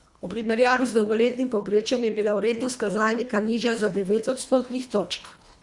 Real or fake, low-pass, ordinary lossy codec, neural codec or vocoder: fake; 10.8 kHz; Opus, 64 kbps; codec, 24 kHz, 1.5 kbps, HILCodec